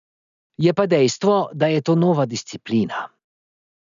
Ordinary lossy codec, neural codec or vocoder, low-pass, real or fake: none; none; 7.2 kHz; real